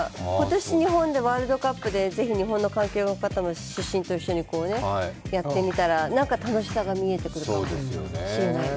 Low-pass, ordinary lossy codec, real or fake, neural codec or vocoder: none; none; real; none